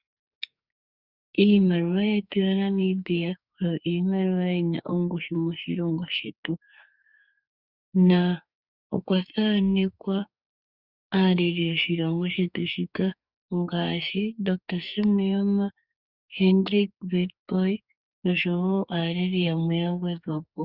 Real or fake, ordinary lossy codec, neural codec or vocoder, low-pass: fake; Opus, 64 kbps; codec, 44.1 kHz, 2.6 kbps, SNAC; 5.4 kHz